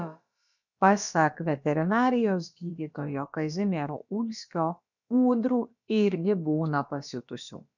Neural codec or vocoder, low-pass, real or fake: codec, 16 kHz, about 1 kbps, DyCAST, with the encoder's durations; 7.2 kHz; fake